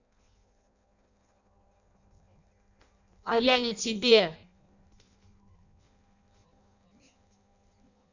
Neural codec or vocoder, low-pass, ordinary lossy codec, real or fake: codec, 16 kHz in and 24 kHz out, 0.6 kbps, FireRedTTS-2 codec; 7.2 kHz; none; fake